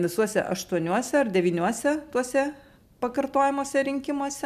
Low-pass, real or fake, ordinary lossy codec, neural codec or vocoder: 14.4 kHz; real; MP3, 96 kbps; none